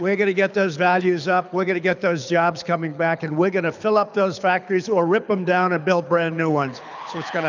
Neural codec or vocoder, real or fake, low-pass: codec, 24 kHz, 6 kbps, HILCodec; fake; 7.2 kHz